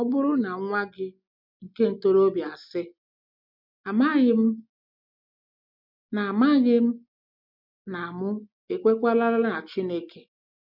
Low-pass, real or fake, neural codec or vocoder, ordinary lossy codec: 5.4 kHz; real; none; none